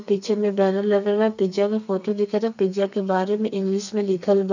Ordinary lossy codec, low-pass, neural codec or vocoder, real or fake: none; 7.2 kHz; codec, 32 kHz, 1.9 kbps, SNAC; fake